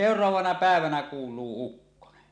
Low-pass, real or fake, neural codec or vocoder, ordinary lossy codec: 9.9 kHz; real; none; none